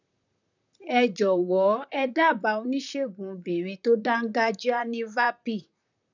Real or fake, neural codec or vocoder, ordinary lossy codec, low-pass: fake; vocoder, 44.1 kHz, 128 mel bands, Pupu-Vocoder; none; 7.2 kHz